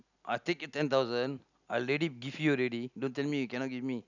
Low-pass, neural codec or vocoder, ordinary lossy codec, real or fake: 7.2 kHz; none; none; real